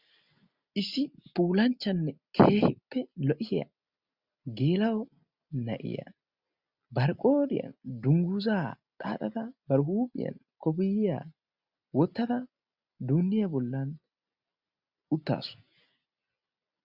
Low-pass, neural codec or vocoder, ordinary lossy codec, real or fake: 5.4 kHz; none; Opus, 64 kbps; real